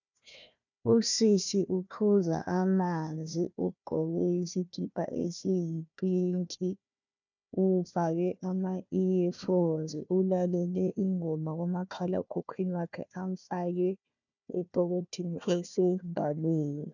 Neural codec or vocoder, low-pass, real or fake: codec, 16 kHz, 1 kbps, FunCodec, trained on Chinese and English, 50 frames a second; 7.2 kHz; fake